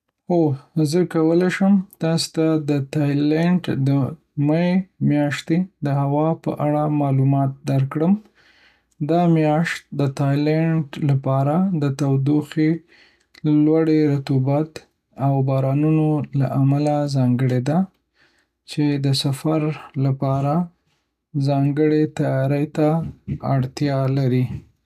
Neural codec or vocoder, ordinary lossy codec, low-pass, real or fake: none; none; 14.4 kHz; real